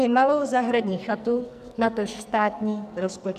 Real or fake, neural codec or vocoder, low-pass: fake; codec, 44.1 kHz, 2.6 kbps, SNAC; 14.4 kHz